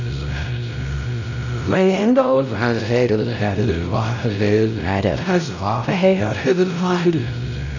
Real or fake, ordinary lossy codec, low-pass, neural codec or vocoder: fake; none; 7.2 kHz; codec, 16 kHz, 0.5 kbps, X-Codec, WavLM features, trained on Multilingual LibriSpeech